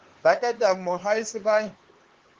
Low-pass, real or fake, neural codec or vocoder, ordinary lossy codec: 7.2 kHz; fake; codec, 16 kHz, 4 kbps, X-Codec, HuBERT features, trained on LibriSpeech; Opus, 16 kbps